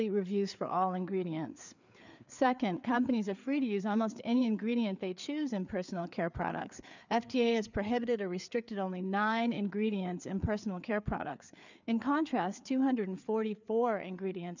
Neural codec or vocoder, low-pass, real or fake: codec, 16 kHz, 4 kbps, FreqCodec, larger model; 7.2 kHz; fake